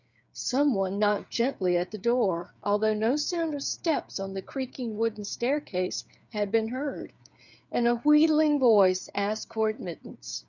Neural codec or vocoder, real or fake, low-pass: codec, 16 kHz, 8 kbps, FreqCodec, smaller model; fake; 7.2 kHz